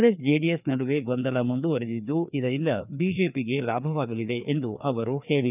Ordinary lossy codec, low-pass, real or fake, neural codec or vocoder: none; 3.6 kHz; fake; codec, 16 kHz, 2 kbps, FreqCodec, larger model